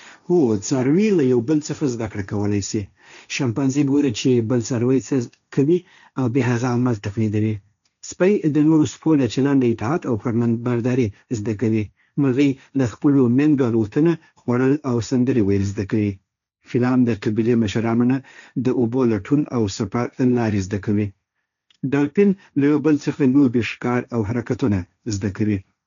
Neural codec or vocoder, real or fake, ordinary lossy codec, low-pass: codec, 16 kHz, 1.1 kbps, Voila-Tokenizer; fake; none; 7.2 kHz